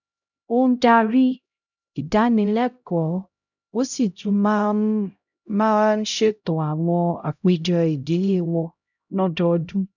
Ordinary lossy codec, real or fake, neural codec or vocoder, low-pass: none; fake; codec, 16 kHz, 0.5 kbps, X-Codec, HuBERT features, trained on LibriSpeech; 7.2 kHz